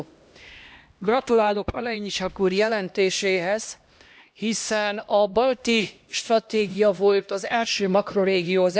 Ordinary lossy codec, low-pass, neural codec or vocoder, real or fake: none; none; codec, 16 kHz, 1 kbps, X-Codec, HuBERT features, trained on LibriSpeech; fake